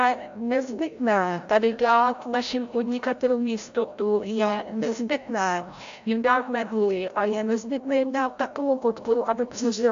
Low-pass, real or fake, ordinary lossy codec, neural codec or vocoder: 7.2 kHz; fake; AAC, 64 kbps; codec, 16 kHz, 0.5 kbps, FreqCodec, larger model